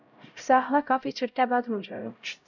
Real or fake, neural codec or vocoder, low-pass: fake; codec, 16 kHz, 0.5 kbps, X-Codec, HuBERT features, trained on LibriSpeech; 7.2 kHz